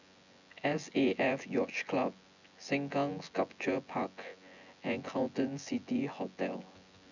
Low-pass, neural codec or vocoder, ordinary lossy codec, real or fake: 7.2 kHz; vocoder, 24 kHz, 100 mel bands, Vocos; none; fake